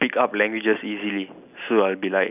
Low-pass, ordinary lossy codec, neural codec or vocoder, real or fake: 3.6 kHz; none; none; real